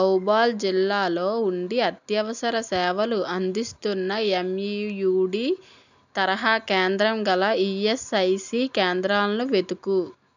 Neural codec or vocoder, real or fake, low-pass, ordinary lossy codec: none; real; 7.2 kHz; none